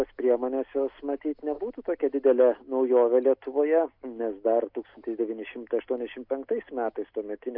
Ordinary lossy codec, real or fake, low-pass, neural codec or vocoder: MP3, 48 kbps; real; 5.4 kHz; none